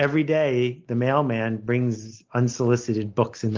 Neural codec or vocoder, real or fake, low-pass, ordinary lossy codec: none; real; 7.2 kHz; Opus, 24 kbps